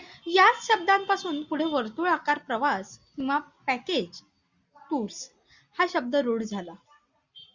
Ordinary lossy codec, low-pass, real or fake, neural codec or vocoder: Opus, 64 kbps; 7.2 kHz; real; none